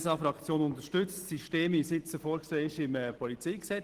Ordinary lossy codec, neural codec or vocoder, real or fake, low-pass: Opus, 16 kbps; none; real; 14.4 kHz